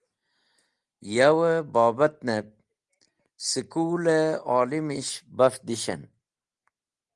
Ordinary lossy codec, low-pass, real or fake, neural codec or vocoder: Opus, 24 kbps; 10.8 kHz; real; none